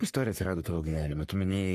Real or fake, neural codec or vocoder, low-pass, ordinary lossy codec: fake; codec, 44.1 kHz, 3.4 kbps, Pupu-Codec; 14.4 kHz; AAC, 64 kbps